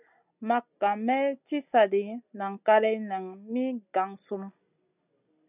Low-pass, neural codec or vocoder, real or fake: 3.6 kHz; none; real